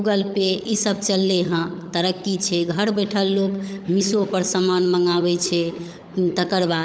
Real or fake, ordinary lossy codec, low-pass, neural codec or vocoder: fake; none; none; codec, 16 kHz, 16 kbps, FunCodec, trained on LibriTTS, 50 frames a second